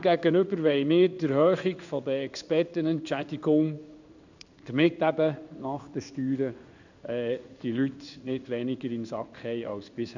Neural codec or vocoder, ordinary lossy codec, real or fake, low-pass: codec, 16 kHz in and 24 kHz out, 1 kbps, XY-Tokenizer; none; fake; 7.2 kHz